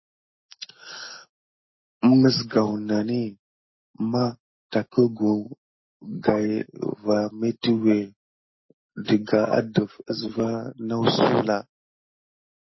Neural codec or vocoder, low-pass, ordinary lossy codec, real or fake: none; 7.2 kHz; MP3, 24 kbps; real